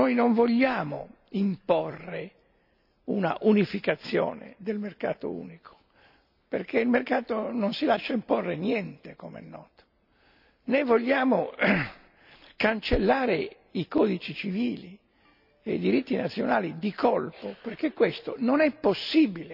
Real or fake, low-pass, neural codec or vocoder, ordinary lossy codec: real; 5.4 kHz; none; none